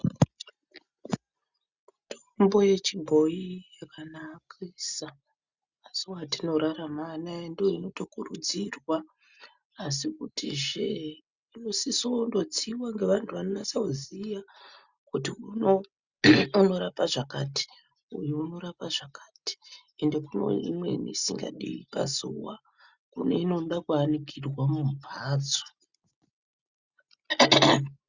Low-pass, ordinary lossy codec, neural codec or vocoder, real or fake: 7.2 kHz; Opus, 64 kbps; none; real